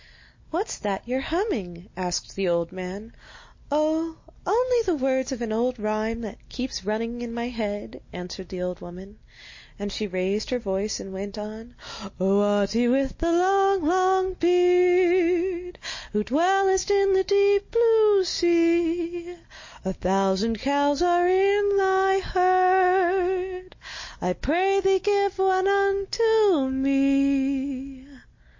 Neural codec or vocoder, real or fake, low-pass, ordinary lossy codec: none; real; 7.2 kHz; MP3, 32 kbps